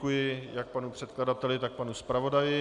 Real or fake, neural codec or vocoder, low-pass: real; none; 10.8 kHz